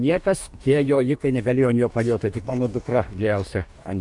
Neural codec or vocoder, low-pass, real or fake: codec, 44.1 kHz, 2.6 kbps, DAC; 10.8 kHz; fake